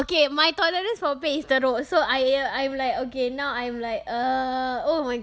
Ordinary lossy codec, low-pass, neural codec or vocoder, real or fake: none; none; none; real